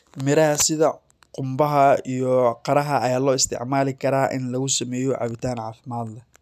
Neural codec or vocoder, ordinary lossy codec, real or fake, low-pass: none; none; real; 14.4 kHz